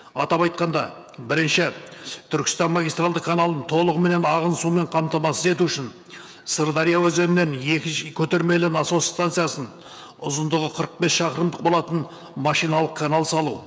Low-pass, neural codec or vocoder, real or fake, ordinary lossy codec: none; codec, 16 kHz, 16 kbps, FreqCodec, smaller model; fake; none